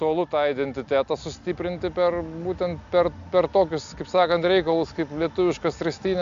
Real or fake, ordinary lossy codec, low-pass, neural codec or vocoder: real; AAC, 64 kbps; 7.2 kHz; none